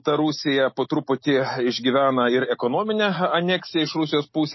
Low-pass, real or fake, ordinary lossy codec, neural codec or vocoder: 7.2 kHz; real; MP3, 24 kbps; none